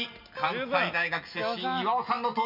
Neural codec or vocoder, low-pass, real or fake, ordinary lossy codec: none; 5.4 kHz; real; none